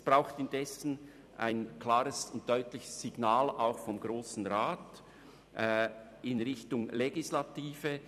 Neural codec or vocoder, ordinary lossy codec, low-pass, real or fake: vocoder, 44.1 kHz, 128 mel bands every 256 samples, BigVGAN v2; none; 14.4 kHz; fake